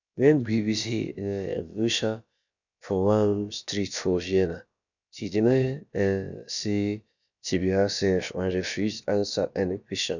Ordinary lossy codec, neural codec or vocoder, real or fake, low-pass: none; codec, 16 kHz, about 1 kbps, DyCAST, with the encoder's durations; fake; 7.2 kHz